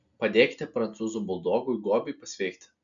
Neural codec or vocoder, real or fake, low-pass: none; real; 7.2 kHz